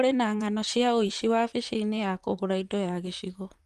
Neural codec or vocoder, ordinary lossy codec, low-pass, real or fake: vocoder, 44.1 kHz, 128 mel bands, Pupu-Vocoder; Opus, 32 kbps; 14.4 kHz; fake